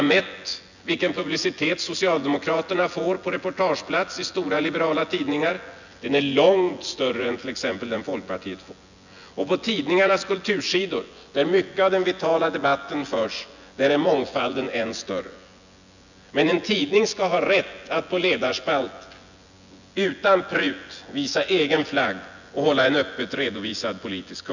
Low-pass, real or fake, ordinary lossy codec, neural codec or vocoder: 7.2 kHz; fake; none; vocoder, 24 kHz, 100 mel bands, Vocos